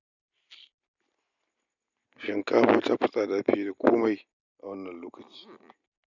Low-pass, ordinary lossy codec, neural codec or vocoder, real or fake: 7.2 kHz; none; none; real